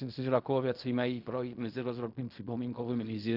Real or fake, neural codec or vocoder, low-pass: fake; codec, 16 kHz in and 24 kHz out, 0.4 kbps, LongCat-Audio-Codec, fine tuned four codebook decoder; 5.4 kHz